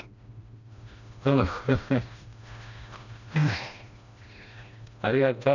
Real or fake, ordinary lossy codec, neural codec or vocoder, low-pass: fake; none; codec, 16 kHz, 1 kbps, FreqCodec, smaller model; 7.2 kHz